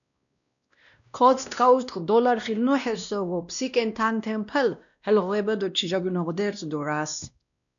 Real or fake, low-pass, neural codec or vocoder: fake; 7.2 kHz; codec, 16 kHz, 1 kbps, X-Codec, WavLM features, trained on Multilingual LibriSpeech